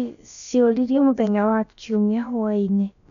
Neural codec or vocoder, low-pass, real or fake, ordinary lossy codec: codec, 16 kHz, about 1 kbps, DyCAST, with the encoder's durations; 7.2 kHz; fake; none